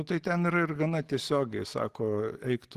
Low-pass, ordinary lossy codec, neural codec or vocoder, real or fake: 14.4 kHz; Opus, 16 kbps; none; real